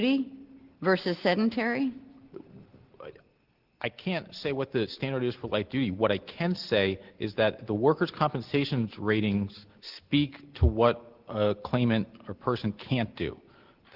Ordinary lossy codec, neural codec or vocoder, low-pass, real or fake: Opus, 16 kbps; none; 5.4 kHz; real